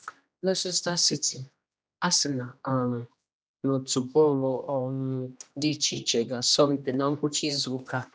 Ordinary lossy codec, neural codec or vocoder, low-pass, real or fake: none; codec, 16 kHz, 1 kbps, X-Codec, HuBERT features, trained on general audio; none; fake